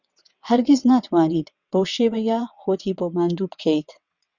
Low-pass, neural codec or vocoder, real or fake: 7.2 kHz; vocoder, 22.05 kHz, 80 mel bands, WaveNeXt; fake